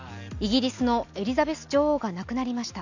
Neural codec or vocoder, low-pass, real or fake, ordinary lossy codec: none; 7.2 kHz; real; none